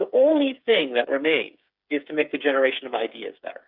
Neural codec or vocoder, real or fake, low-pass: codec, 16 kHz, 8 kbps, FreqCodec, smaller model; fake; 5.4 kHz